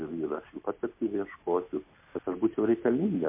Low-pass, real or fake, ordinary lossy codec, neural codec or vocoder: 3.6 kHz; real; MP3, 24 kbps; none